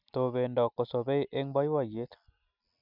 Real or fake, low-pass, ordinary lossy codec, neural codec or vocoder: real; 5.4 kHz; none; none